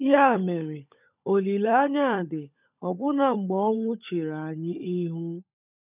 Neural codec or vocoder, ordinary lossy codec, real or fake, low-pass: codec, 16 kHz, 16 kbps, FunCodec, trained on LibriTTS, 50 frames a second; none; fake; 3.6 kHz